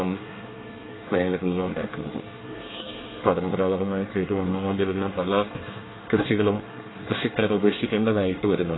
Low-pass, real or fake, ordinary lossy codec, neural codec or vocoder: 7.2 kHz; fake; AAC, 16 kbps; codec, 24 kHz, 1 kbps, SNAC